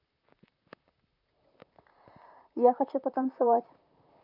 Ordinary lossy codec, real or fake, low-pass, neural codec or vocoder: AAC, 48 kbps; fake; 5.4 kHz; vocoder, 44.1 kHz, 128 mel bands, Pupu-Vocoder